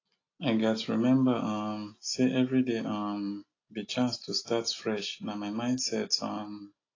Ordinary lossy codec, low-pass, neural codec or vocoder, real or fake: AAC, 32 kbps; 7.2 kHz; none; real